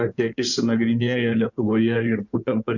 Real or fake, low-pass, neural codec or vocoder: fake; 7.2 kHz; codec, 16 kHz in and 24 kHz out, 2.2 kbps, FireRedTTS-2 codec